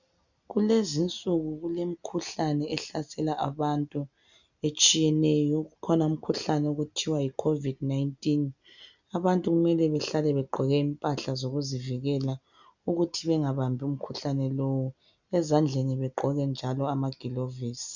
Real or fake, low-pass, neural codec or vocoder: real; 7.2 kHz; none